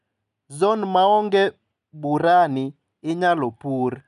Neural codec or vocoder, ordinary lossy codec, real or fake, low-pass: none; none; real; 10.8 kHz